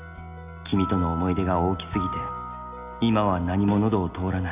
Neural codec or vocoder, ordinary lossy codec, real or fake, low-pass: none; none; real; 3.6 kHz